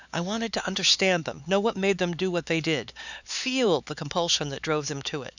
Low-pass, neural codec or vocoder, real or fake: 7.2 kHz; codec, 16 kHz, 4 kbps, X-Codec, HuBERT features, trained on LibriSpeech; fake